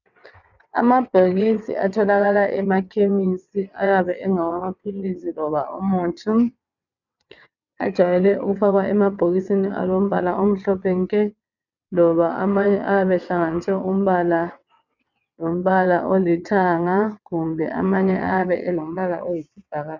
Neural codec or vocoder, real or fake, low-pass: vocoder, 22.05 kHz, 80 mel bands, WaveNeXt; fake; 7.2 kHz